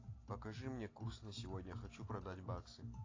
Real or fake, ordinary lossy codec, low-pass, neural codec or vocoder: real; AAC, 32 kbps; 7.2 kHz; none